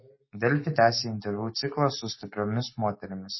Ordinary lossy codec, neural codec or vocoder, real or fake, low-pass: MP3, 24 kbps; none; real; 7.2 kHz